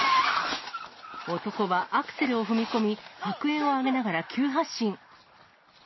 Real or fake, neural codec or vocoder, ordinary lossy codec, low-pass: real; none; MP3, 24 kbps; 7.2 kHz